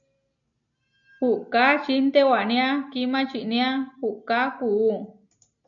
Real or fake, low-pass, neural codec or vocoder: real; 7.2 kHz; none